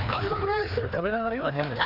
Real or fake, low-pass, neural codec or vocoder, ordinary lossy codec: fake; 5.4 kHz; codec, 16 kHz, 2 kbps, FreqCodec, larger model; none